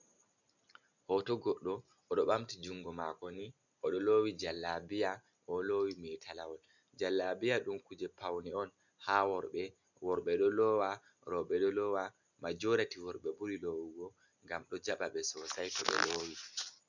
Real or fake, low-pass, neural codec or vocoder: real; 7.2 kHz; none